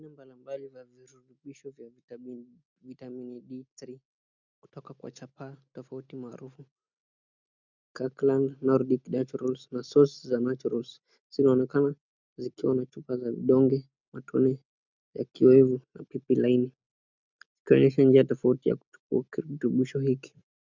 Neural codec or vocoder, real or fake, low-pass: none; real; 7.2 kHz